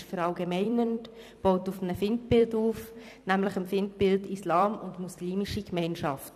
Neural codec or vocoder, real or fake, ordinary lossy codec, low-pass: vocoder, 44.1 kHz, 128 mel bands every 256 samples, BigVGAN v2; fake; none; 14.4 kHz